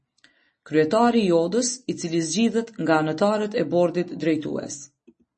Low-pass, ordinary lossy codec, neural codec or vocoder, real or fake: 10.8 kHz; MP3, 32 kbps; none; real